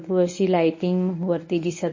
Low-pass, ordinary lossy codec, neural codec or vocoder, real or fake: 7.2 kHz; MP3, 32 kbps; codec, 24 kHz, 0.9 kbps, WavTokenizer, medium speech release version 1; fake